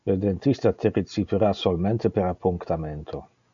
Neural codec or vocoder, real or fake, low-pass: none; real; 7.2 kHz